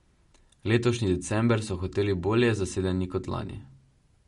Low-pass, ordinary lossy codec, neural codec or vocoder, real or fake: 19.8 kHz; MP3, 48 kbps; none; real